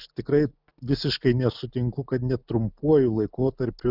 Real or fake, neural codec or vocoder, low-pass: real; none; 5.4 kHz